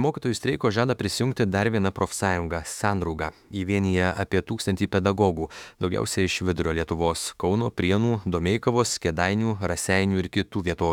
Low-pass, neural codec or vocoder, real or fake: 19.8 kHz; autoencoder, 48 kHz, 32 numbers a frame, DAC-VAE, trained on Japanese speech; fake